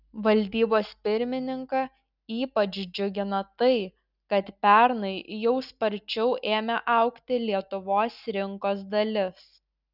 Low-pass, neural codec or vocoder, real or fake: 5.4 kHz; none; real